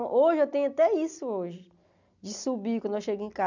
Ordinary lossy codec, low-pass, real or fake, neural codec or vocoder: none; 7.2 kHz; real; none